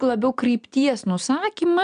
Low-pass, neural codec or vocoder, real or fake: 9.9 kHz; none; real